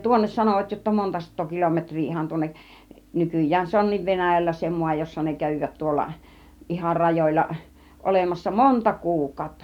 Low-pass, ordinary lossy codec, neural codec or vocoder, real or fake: 19.8 kHz; none; none; real